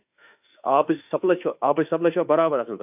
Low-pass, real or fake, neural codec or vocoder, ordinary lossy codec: 3.6 kHz; fake; autoencoder, 48 kHz, 32 numbers a frame, DAC-VAE, trained on Japanese speech; AAC, 32 kbps